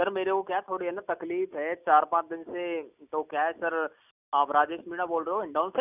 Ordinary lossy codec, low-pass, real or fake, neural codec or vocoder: none; 3.6 kHz; real; none